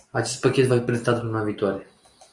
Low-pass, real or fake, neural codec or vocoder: 10.8 kHz; real; none